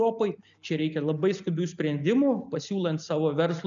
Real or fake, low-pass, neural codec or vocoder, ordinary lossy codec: real; 7.2 kHz; none; MP3, 96 kbps